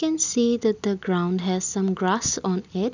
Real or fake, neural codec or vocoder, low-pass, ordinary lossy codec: real; none; 7.2 kHz; none